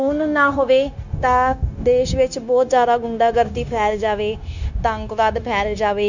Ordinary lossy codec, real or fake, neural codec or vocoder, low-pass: none; fake; codec, 16 kHz, 0.9 kbps, LongCat-Audio-Codec; 7.2 kHz